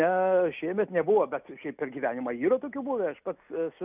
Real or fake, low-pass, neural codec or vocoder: real; 3.6 kHz; none